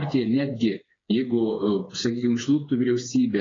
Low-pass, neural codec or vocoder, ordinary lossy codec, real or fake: 7.2 kHz; codec, 16 kHz, 4 kbps, FreqCodec, smaller model; AAC, 32 kbps; fake